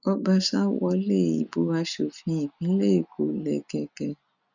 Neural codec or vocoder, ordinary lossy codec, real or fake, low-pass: none; none; real; 7.2 kHz